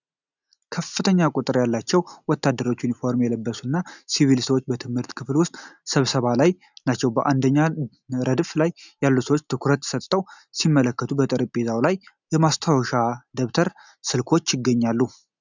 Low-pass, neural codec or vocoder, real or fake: 7.2 kHz; none; real